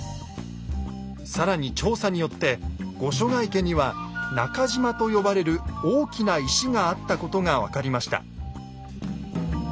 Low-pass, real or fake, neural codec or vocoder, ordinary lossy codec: none; real; none; none